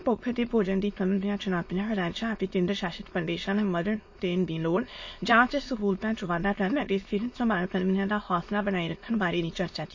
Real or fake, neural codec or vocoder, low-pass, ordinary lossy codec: fake; autoencoder, 22.05 kHz, a latent of 192 numbers a frame, VITS, trained on many speakers; 7.2 kHz; MP3, 32 kbps